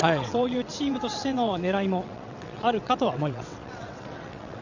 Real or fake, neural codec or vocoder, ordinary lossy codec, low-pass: fake; vocoder, 22.05 kHz, 80 mel bands, WaveNeXt; none; 7.2 kHz